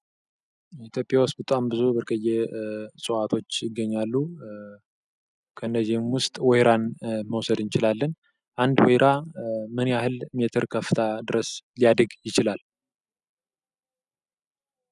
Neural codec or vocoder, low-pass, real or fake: none; 10.8 kHz; real